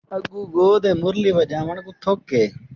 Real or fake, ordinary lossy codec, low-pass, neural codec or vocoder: real; Opus, 32 kbps; 7.2 kHz; none